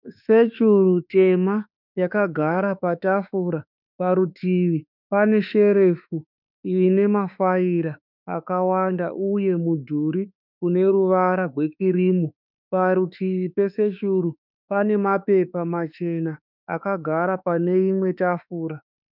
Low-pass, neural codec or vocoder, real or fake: 5.4 kHz; autoencoder, 48 kHz, 32 numbers a frame, DAC-VAE, trained on Japanese speech; fake